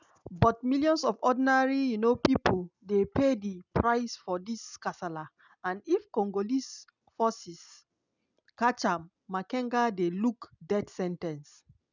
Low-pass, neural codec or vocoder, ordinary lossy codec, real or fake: 7.2 kHz; none; none; real